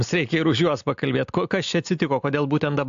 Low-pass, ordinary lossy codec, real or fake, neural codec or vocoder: 7.2 kHz; AAC, 64 kbps; real; none